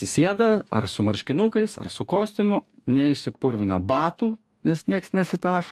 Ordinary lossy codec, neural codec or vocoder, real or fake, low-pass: AAC, 96 kbps; codec, 44.1 kHz, 2.6 kbps, DAC; fake; 14.4 kHz